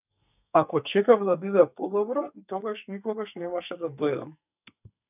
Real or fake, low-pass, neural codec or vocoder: fake; 3.6 kHz; codec, 44.1 kHz, 2.6 kbps, SNAC